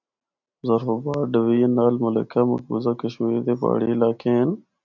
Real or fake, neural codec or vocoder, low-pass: real; none; 7.2 kHz